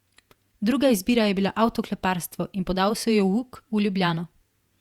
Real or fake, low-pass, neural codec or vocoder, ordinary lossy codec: fake; 19.8 kHz; vocoder, 48 kHz, 128 mel bands, Vocos; Opus, 64 kbps